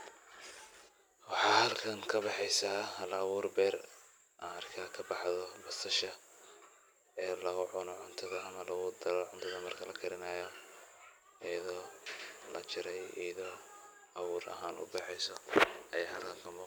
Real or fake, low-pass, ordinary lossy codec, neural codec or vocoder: real; none; none; none